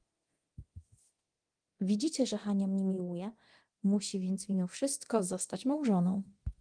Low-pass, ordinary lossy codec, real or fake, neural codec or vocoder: 9.9 kHz; Opus, 24 kbps; fake; codec, 24 kHz, 0.9 kbps, DualCodec